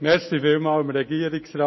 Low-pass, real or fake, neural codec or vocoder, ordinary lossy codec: 7.2 kHz; real; none; MP3, 24 kbps